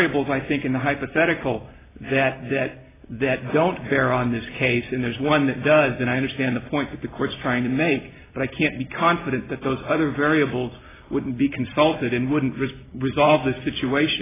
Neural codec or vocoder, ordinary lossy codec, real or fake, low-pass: none; AAC, 16 kbps; real; 3.6 kHz